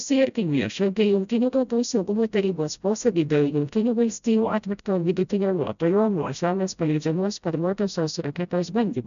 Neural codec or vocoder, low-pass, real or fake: codec, 16 kHz, 0.5 kbps, FreqCodec, smaller model; 7.2 kHz; fake